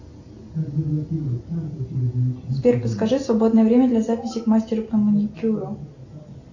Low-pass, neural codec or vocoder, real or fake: 7.2 kHz; none; real